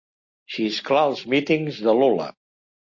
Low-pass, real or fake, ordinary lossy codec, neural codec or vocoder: 7.2 kHz; real; MP3, 48 kbps; none